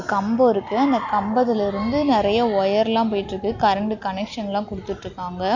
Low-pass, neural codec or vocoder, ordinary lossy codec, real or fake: 7.2 kHz; none; none; real